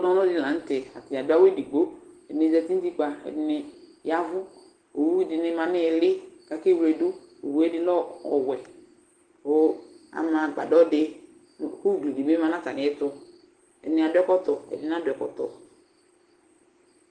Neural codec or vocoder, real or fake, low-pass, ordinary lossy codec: none; real; 9.9 kHz; Opus, 16 kbps